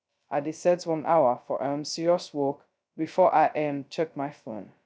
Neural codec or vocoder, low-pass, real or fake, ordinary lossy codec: codec, 16 kHz, 0.2 kbps, FocalCodec; none; fake; none